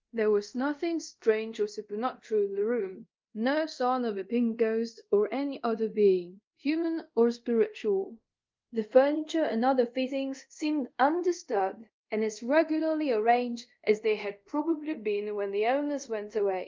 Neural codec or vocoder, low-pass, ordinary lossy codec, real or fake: codec, 24 kHz, 0.5 kbps, DualCodec; 7.2 kHz; Opus, 32 kbps; fake